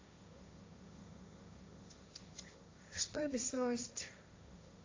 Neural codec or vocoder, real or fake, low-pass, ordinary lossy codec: codec, 16 kHz, 1.1 kbps, Voila-Tokenizer; fake; 7.2 kHz; AAC, 32 kbps